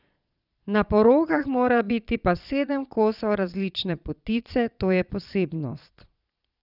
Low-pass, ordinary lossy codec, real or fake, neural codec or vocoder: 5.4 kHz; none; fake; vocoder, 22.05 kHz, 80 mel bands, Vocos